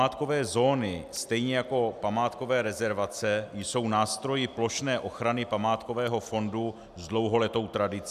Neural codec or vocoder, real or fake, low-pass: none; real; 14.4 kHz